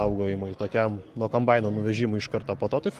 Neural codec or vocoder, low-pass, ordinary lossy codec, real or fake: codec, 44.1 kHz, 7.8 kbps, Pupu-Codec; 14.4 kHz; Opus, 24 kbps; fake